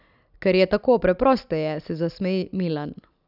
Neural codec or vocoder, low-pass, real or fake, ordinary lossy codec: none; 5.4 kHz; real; none